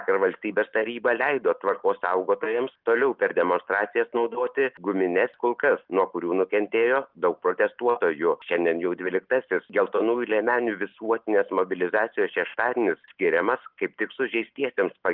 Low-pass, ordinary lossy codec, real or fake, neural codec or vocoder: 5.4 kHz; Opus, 32 kbps; real; none